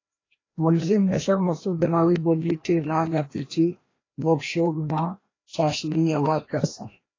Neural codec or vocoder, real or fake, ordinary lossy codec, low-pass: codec, 16 kHz, 1 kbps, FreqCodec, larger model; fake; AAC, 32 kbps; 7.2 kHz